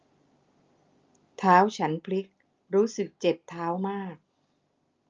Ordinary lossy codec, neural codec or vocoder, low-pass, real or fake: Opus, 24 kbps; none; 7.2 kHz; real